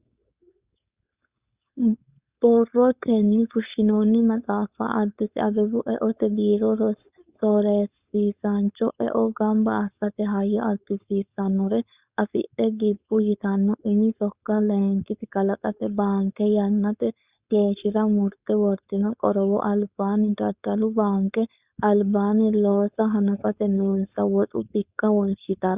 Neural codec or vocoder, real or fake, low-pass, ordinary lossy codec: codec, 16 kHz, 4.8 kbps, FACodec; fake; 3.6 kHz; Opus, 64 kbps